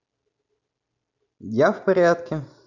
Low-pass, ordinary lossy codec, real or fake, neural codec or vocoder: 7.2 kHz; none; real; none